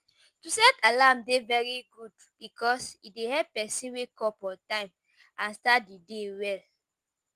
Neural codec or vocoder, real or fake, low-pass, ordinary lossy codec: none; real; 14.4 kHz; Opus, 24 kbps